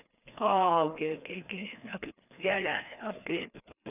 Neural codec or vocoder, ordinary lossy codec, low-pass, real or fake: codec, 16 kHz, 2 kbps, FreqCodec, larger model; none; 3.6 kHz; fake